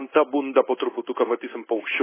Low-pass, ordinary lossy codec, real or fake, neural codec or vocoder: 3.6 kHz; MP3, 16 kbps; fake; codec, 16 kHz in and 24 kHz out, 1 kbps, XY-Tokenizer